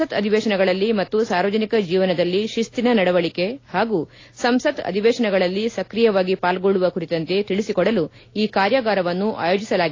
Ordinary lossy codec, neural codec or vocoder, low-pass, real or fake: AAC, 32 kbps; none; 7.2 kHz; real